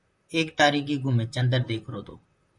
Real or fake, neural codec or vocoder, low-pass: fake; vocoder, 44.1 kHz, 128 mel bands, Pupu-Vocoder; 10.8 kHz